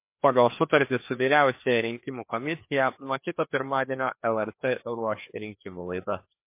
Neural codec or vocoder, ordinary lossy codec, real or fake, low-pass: codec, 16 kHz, 2 kbps, FreqCodec, larger model; MP3, 24 kbps; fake; 3.6 kHz